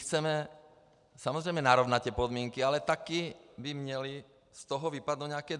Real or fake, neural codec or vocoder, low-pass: real; none; 10.8 kHz